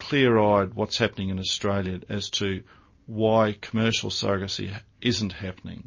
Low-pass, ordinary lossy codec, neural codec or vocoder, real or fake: 7.2 kHz; MP3, 32 kbps; none; real